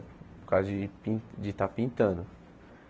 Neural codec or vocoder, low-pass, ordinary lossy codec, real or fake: none; none; none; real